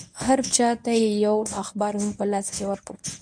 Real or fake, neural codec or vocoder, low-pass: fake; codec, 24 kHz, 0.9 kbps, WavTokenizer, medium speech release version 1; 9.9 kHz